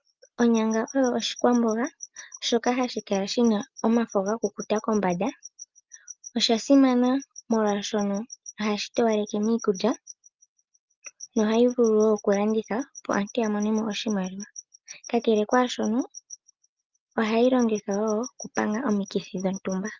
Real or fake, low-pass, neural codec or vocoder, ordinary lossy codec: real; 7.2 kHz; none; Opus, 24 kbps